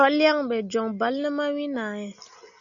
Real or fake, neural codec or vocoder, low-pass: real; none; 7.2 kHz